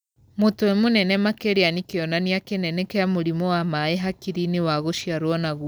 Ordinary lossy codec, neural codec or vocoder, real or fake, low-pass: none; none; real; none